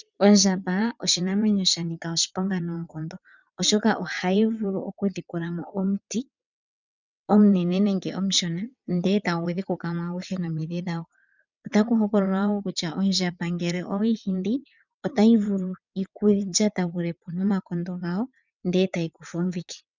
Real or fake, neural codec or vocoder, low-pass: fake; vocoder, 22.05 kHz, 80 mel bands, WaveNeXt; 7.2 kHz